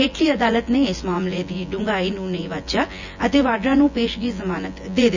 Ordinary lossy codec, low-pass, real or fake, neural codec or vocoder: none; 7.2 kHz; fake; vocoder, 24 kHz, 100 mel bands, Vocos